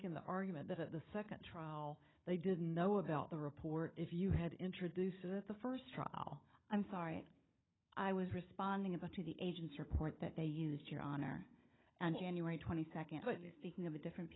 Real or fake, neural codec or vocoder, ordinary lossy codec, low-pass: fake; codec, 16 kHz, 16 kbps, FunCodec, trained on Chinese and English, 50 frames a second; AAC, 16 kbps; 7.2 kHz